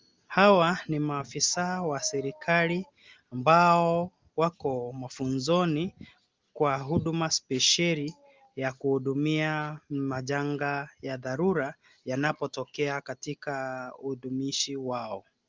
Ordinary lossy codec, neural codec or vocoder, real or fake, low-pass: Opus, 32 kbps; none; real; 7.2 kHz